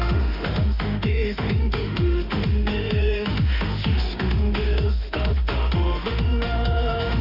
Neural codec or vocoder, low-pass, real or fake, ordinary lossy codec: autoencoder, 48 kHz, 32 numbers a frame, DAC-VAE, trained on Japanese speech; 5.4 kHz; fake; AAC, 48 kbps